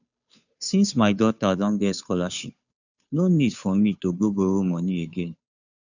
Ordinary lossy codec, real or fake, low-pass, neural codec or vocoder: none; fake; 7.2 kHz; codec, 16 kHz, 2 kbps, FunCodec, trained on Chinese and English, 25 frames a second